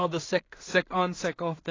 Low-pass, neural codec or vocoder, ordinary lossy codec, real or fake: 7.2 kHz; codec, 16 kHz in and 24 kHz out, 0.4 kbps, LongCat-Audio-Codec, two codebook decoder; AAC, 32 kbps; fake